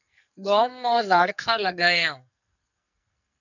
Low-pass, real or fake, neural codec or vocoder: 7.2 kHz; fake; codec, 44.1 kHz, 2.6 kbps, SNAC